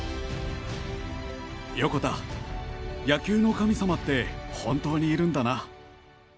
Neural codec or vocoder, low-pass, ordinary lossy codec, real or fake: none; none; none; real